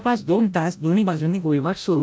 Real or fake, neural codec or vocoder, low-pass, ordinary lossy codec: fake; codec, 16 kHz, 0.5 kbps, FreqCodec, larger model; none; none